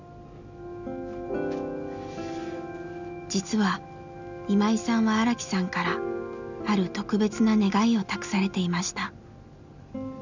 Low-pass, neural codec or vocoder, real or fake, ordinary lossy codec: 7.2 kHz; none; real; none